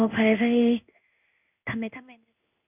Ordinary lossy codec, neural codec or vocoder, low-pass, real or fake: none; codec, 16 kHz in and 24 kHz out, 1 kbps, XY-Tokenizer; 3.6 kHz; fake